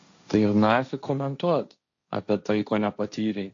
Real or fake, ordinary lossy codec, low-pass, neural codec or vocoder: fake; AAC, 48 kbps; 7.2 kHz; codec, 16 kHz, 1.1 kbps, Voila-Tokenizer